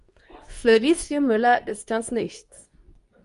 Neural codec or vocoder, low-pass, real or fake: codec, 24 kHz, 0.9 kbps, WavTokenizer, medium speech release version 2; 10.8 kHz; fake